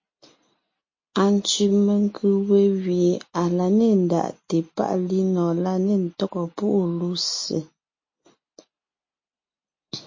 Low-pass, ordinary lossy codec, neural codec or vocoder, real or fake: 7.2 kHz; MP3, 32 kbps; none; real